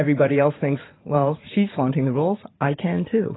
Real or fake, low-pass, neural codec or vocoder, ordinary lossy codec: fake; 7.2 kHz; vocoder, 44.1 kHz, 80 mel bands, Vocos; AAC, 16 kbps